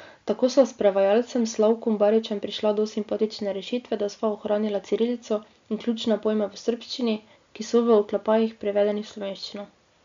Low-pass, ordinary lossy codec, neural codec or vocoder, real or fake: 7.2 kHz; MP3, 64 kbps; none; real